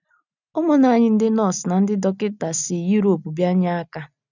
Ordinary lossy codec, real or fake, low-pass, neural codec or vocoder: none; real; 7.2 kHz; none